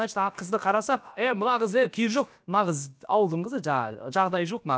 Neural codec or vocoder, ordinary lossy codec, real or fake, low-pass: codec, 16 kHz, about 1 kbps, DyCAST, with the encoder's durations; none; fake; none